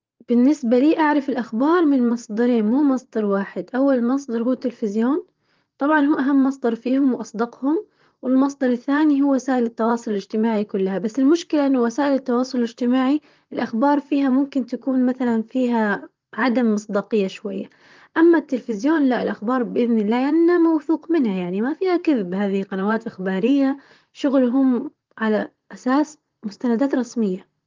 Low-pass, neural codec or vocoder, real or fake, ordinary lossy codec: 7.2 kHz; vocoder, 44.1 kHz, 128 mel bands, Pupu-Vocoder; fake; Opus, 32 kbps